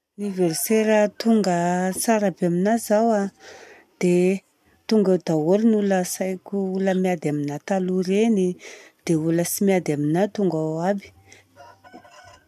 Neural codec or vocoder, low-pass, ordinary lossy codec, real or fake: none; 14.4 kHz; none; real